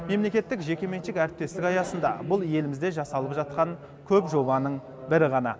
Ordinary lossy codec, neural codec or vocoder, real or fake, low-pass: none; none; real; none